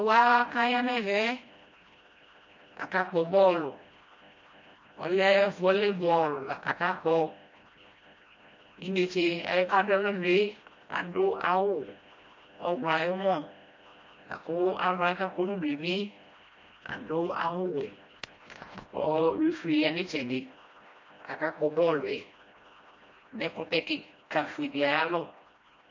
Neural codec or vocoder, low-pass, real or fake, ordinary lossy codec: codec, 16 kHz, 1 kbps, FreqCodec, smaller model; 7.2 kHz; fake; MP3, 48 kbps